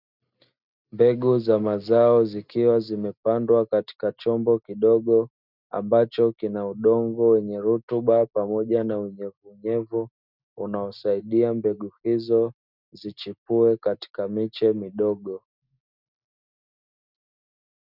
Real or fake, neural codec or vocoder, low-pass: real; none; 5.4 kHz